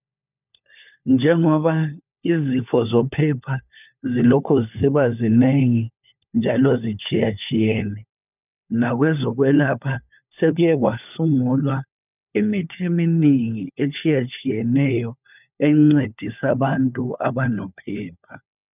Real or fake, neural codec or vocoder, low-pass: fake; codec, 16 kHz, 4 kbps, FunCodec, trained on LibriTTS, 50 frames a second; 3.6 kHz